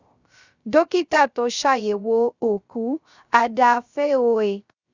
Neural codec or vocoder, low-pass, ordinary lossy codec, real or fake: codec, 16 kHz, 0.3 kbps, FocalCodec; 7.2 kHz; Opus, 64 kbps; fake